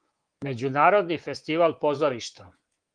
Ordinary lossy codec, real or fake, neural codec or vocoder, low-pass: Opus, 24 kbps; fake; codec, 24 kHz, 3.1 kbps, DualCodec; 9.9 kHz